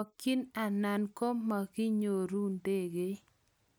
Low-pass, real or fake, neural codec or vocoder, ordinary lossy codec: none; real; none; none